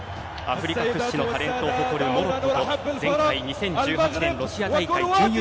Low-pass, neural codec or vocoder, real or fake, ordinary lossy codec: none; none; real; none